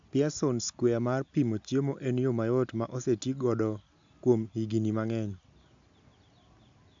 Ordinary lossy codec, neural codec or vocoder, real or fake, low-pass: MP3, 64 kbps; none; real; 7.2 kHz